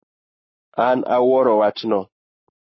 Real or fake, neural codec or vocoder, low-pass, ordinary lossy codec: fake; codec, 44.1 kHz, 7.8 kbps, Pupu-Codec; 7.2 kHz; MP3, 24 kbps